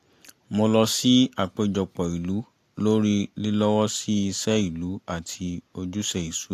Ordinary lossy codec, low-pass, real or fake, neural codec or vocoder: AAC, 64 kbps; 14.4 kHz; real; none